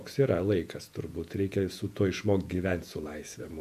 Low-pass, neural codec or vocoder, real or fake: 14.4 kHz; vocoder, 48 kHz, 128 mel bands, Vocos; fake